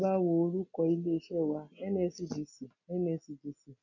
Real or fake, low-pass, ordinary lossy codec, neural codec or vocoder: real; 7.2 kHz; none; none